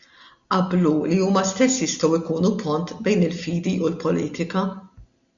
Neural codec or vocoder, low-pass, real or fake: none; 7.2 kHz; real